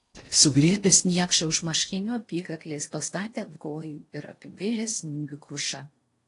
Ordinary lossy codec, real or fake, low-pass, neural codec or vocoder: AAC, 48 kbps; fake; 10.8 kHz; codec, 16 kHz in and 24 kHz out, 0.6 kbps, FocalCodec, streaming, 4096 codes